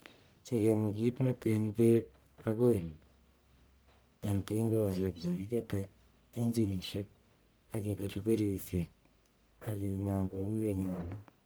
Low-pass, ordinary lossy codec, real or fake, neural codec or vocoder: none; none; fake; codec, 44.1 kHz, 1.7 kbps, Pupu-Codec